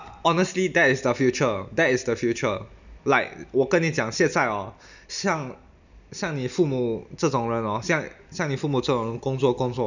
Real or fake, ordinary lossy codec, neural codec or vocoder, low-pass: real; none; none; 7.2 kHz